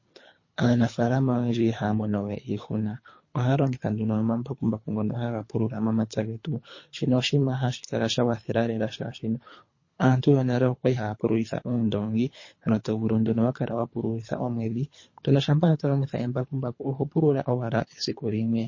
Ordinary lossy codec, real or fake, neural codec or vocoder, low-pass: MP3, 32 kbps; fake; codec, 24 kHz, 3 kbps, HILCodec; 7.2 kHz